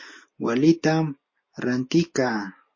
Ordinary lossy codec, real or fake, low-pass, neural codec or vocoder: MP3, 32 kbps; real; 7.2 kHz; none